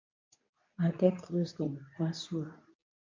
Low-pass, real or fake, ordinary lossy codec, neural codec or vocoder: 7.2 kHz; fake; MP3, 48 kbps; codec, 24 kHz, 0.9 kbps, WavTokenizer, medium speech release version 2